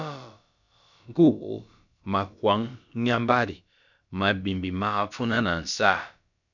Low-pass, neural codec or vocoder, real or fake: 7.2 kHz; codec, 16 kHz, about 1 kbps, DyCAST, with the encoder's durations; fake